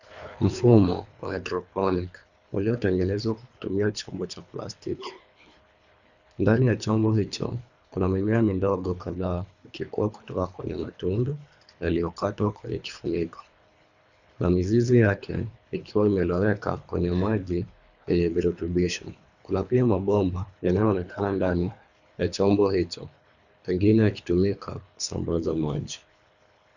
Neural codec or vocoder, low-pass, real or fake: codec, 24 kHz, 3 kbps, HILCodec; 7.2 kHz; fake